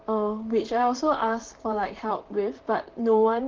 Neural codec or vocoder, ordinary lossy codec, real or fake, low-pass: vocoder, 44.1 kHz, 128 mel bands, Pupu-Vocoder; Opus, 16 kbps; fake; 7.2 kHz